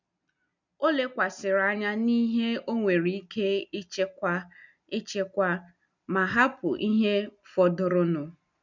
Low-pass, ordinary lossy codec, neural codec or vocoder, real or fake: 7.2 kHz; none; none; real